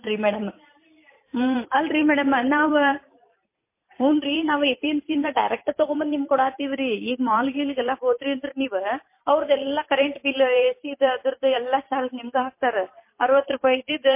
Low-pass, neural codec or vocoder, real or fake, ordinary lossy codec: 3.6 kHz; vocoder, 44.1 kHz, 128 mel bands every 256 samples, BigVGAN v2; fake; MP3, 24 kbps